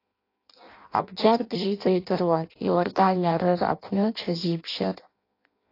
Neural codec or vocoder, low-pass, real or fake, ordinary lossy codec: codec, 16 kHz in and 24 kHz out, 0.6 kbps, FireRedTTS-2 codec; 5.4 kHz; fake; AAC, 32 kbps